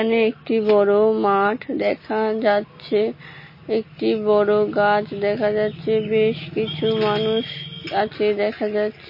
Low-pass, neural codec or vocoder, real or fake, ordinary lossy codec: 5.4 kHz; none; real; MP3, 24 kbps